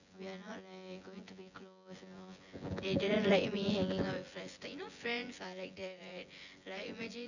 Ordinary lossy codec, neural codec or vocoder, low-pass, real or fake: none; vocoder, 24 kHz, 100 mel bands, Vocos; 7.2 kHz; fake